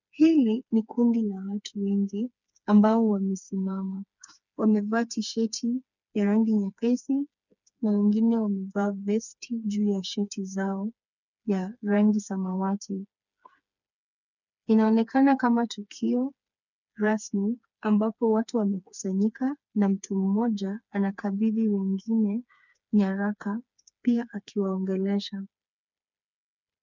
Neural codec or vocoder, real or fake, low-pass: codec, 16 kHz, 4 kbps, FreqCodec, smaller model; fake; 7.2 kHz